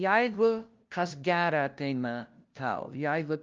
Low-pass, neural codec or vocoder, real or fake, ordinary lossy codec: 7.2 kHz; codec, 16 kHz, 0.5 kbps, FunCodec, trained on LibriTTS, 25 frames a second; fake; Opus, 24 kbps